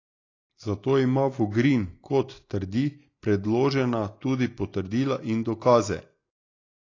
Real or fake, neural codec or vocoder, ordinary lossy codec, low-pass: real; none; AAC, 32 kbps; 7.2 kHz